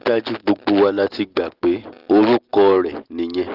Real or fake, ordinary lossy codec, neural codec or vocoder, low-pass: real; Opus, 16 kbps; none; 5.4 kHz